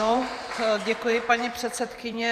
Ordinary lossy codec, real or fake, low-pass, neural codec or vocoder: Opus, 64 kbps; fake; 14.4 kHz; vocoder, 44.1 kHz, 128 mel bands every 512 samples, BigVGAN v2